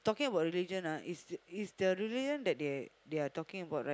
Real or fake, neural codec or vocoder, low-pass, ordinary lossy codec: real; none; none; none